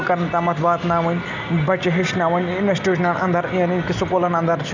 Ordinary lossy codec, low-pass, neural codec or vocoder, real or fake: none; 7.2 kHz; none; real